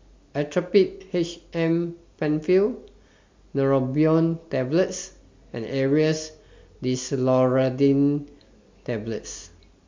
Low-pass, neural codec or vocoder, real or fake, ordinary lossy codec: 7.2 kHz; none; real; MP3, 48 kbps